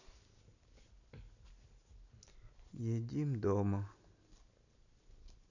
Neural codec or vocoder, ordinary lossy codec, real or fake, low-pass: none; none; real; 7.2 kHz